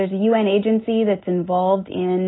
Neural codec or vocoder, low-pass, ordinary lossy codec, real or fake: none; 7.2 kHz; AAC, 16 kbps; real